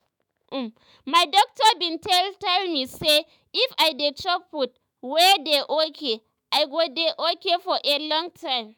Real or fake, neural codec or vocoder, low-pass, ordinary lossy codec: fake; autoencoder, 48 kHz, 128 numbers a frame, DAC-VAE, trained on Japanese speech; none; none